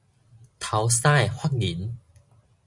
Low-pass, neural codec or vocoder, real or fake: 10.8 kHz; none; real